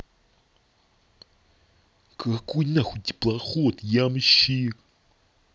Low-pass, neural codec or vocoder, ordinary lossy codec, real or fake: none; none; none; real